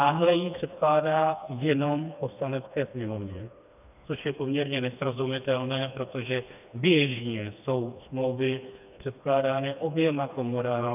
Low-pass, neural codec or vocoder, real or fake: 3.6 kHz; codec, 16 kHz, 2 kbps, FreqCodec, smaller model; fake